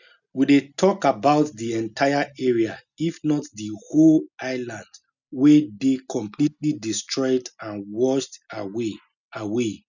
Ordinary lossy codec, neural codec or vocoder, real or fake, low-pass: none; none; real; 7.2 kHz